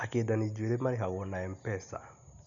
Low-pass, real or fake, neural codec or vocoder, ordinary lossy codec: 7.2 kHz; real; none; none